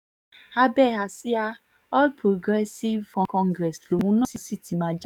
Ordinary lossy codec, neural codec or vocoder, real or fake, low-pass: none; codec, 44.1 kHz, 7.8 kbps, DAC; fake; 19.8 kHz